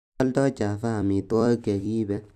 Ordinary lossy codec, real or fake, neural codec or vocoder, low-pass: none; fake; vocoder, 44.1 kHz, 128 mel bands every 256 samples, BigVGAN v2; 14.4 kHz